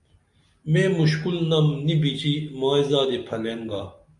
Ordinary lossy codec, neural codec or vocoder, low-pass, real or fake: AAC, 64 kbps; none; 10.8 kHz; real